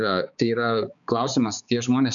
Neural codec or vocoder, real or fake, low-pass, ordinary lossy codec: codec, 16 kHz, 4 kbps, X-Codec, HuBERT features, trained on balanced general audio; fake; 7.2 kHz; MP3, 96 kbps